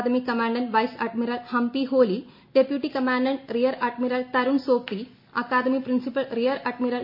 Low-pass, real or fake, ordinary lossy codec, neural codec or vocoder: 5.4 kHz; real; MP3, 32 kbps; none